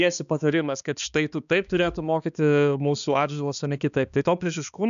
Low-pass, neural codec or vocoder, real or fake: 7.2 kHz; codec, 16 kHz, 2 kbps, X-Codec, HuBERT features, trained on balanced general audio; fake